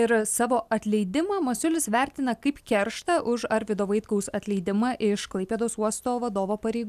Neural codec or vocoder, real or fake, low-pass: none; real; 14.4 kHz